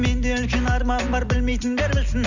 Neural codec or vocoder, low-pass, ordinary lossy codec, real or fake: none; 7.2 kHz; none; real